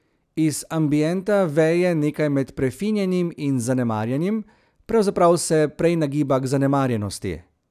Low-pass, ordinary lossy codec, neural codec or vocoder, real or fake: 14.4 kHz; none; none; real